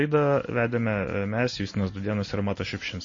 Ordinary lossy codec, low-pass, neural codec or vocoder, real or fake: MP3, 32 kbps; 7.2 kHz; none; real